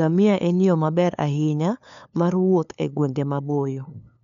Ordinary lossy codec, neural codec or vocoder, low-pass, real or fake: none; codec, 16 kHz, 2 kbps, FunCodec, trained on LibriTTS, 25 frames a second; 7.2 kHz; fake